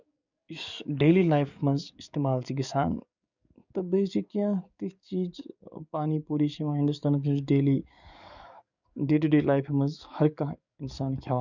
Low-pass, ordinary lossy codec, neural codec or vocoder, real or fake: 7.2 kHz; none; codec, 44.1 kHz, 7.8 kbps, DAC; fake